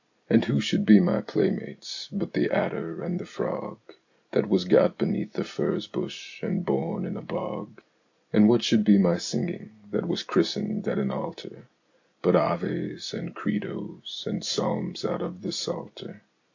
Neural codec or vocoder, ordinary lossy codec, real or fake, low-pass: none; AAC, 48 kbps; real; 7.2 kHz